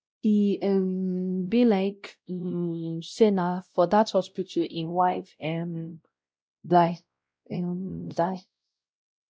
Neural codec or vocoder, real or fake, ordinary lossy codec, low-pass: codec, 16 kHz, 0.5 kbps, X-Codec, WavLM features, trained on Multilingual LibriSpeech; fake; none; none